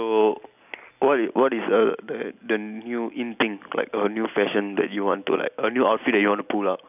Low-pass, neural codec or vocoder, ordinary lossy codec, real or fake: 3.6 kHz; none; none; real